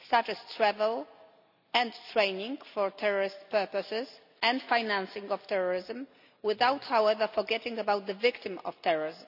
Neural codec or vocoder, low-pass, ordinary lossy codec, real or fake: none; 5.4 kHz; none; real